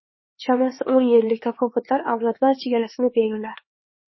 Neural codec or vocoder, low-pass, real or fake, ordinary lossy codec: codec, 16 kHz, 4 kbps, X-Codec, HuBERT features, trained on LibriSpeech; 7.2 kHz; fake; MP3, 24 kbps